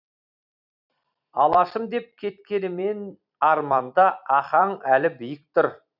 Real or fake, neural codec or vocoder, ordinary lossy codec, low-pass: fake; vocoder, 44.1 kHz, 128 mel bands every 256 samples, BigVGAN v2; none; 5.4 kHz